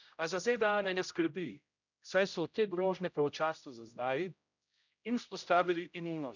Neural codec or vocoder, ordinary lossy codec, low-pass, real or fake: codec, 16 kHz, 0.5 kbps, X-Codec, HuBERT features, trained on general audio; none; 7.2 kHz; fake